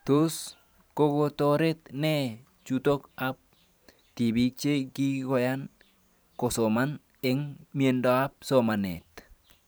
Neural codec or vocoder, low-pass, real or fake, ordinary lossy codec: vocoder, 44.1 kHz, 128 mel bands every 512 samples, BigVGAN v2; none; fake; none